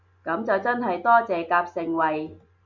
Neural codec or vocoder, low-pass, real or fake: none; 7.2 kHz; real